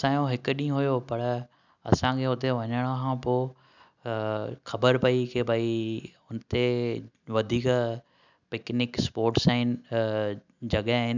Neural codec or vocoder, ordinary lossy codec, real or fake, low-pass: none; none; real; 7.2 kHz